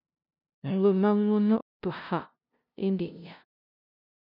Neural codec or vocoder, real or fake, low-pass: codec, 16 kHz, 0.5 kbps, FunCodec, trained on LibriTTS, 25 frames a second; fake; 5.4 kHz